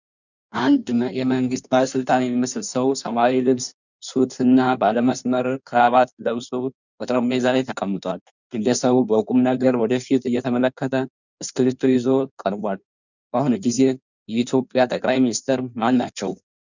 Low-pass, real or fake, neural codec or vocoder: 7.2 kHz; fake; codec, 16 kHz in and 24 kHz out, 1.1 kbps, FireRedTTS-2 codec